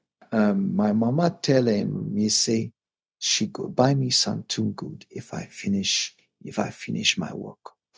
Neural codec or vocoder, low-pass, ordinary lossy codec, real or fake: codec, 16 kHz, 0.4 kbps, LongCat-Audio-Codec; none; none; fake